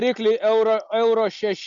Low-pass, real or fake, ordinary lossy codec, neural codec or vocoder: 7.2 kHz; real; Opus, 64 kbps; none